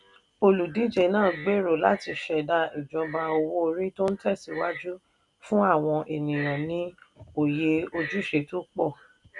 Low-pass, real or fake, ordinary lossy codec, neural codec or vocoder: 10.8 kHz; real; none; none